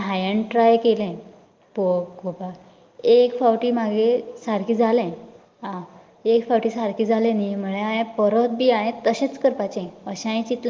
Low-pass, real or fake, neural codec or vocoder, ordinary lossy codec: 7.2 kHz; real; none; Opus, 32 kbps